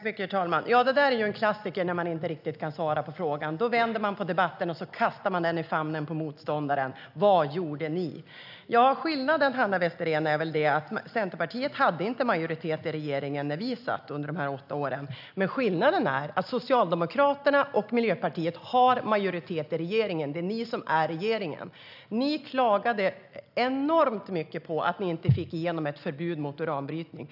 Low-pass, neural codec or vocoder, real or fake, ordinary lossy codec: 5.4 kHz; none; real; none